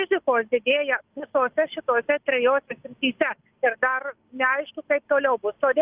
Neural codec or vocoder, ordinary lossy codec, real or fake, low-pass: none; Opus, 32 kbps; real; 3.6 kHz